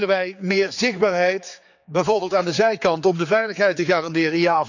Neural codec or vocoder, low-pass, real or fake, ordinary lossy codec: codec, 16 kHz, 4 kbps, X-Codec, HuBERT features, trained on general audio; 7.2 kHz; fake; none